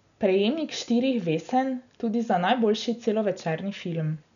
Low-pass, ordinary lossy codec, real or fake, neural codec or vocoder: 7.2 kHz; none; real; none